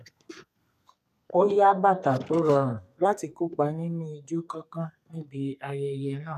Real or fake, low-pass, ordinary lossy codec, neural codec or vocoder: fake; 14.4 kHz; none; codec, 32 kHz, 1.9 kbps, SNAC